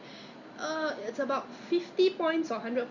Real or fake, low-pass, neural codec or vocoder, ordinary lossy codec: real; 7.2 kHz; none; Opus, 64 kbps